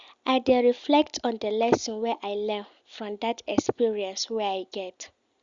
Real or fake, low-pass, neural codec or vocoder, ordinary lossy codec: real; 7.2 kHz; none; Opus, 64 kbps